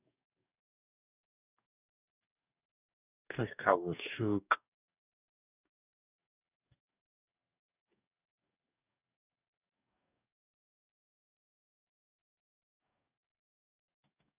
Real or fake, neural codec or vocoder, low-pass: fake; codec, 44.1 kHz, 2.6 kbps, DAC; 3.6 kHz